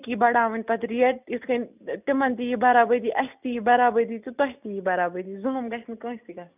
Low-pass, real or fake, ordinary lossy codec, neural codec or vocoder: 3.6 kHz; real; none; none